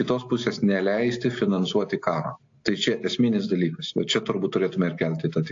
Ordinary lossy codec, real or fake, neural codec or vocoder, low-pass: AAC, 48 kbps; real; none; 7.2 kHz